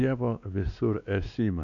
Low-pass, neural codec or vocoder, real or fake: 7.2 kHz; codec, 16 kHz, 2 kbps, X-Codec, WavLM features, trained on Multilingual LibriSpeech; fake